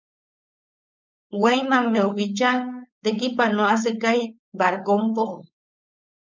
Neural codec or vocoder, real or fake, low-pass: codec, 16 kHz, 4.8 kbps, FACodec; fake; 7.2 kHz